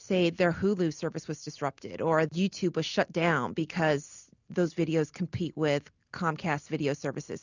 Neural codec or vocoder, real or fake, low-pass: none; real; 7.2 kHz